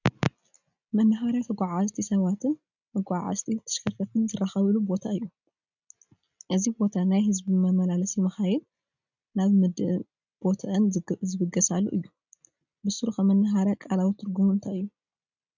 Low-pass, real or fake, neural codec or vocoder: 7.2 kHz; real; none